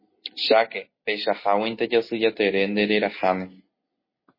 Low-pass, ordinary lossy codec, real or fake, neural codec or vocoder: 5.4 kHz; MP3, 24 kbps; real; none